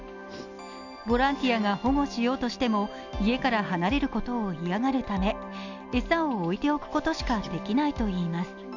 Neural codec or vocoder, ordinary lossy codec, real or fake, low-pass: none; none; real; 7.2 kHz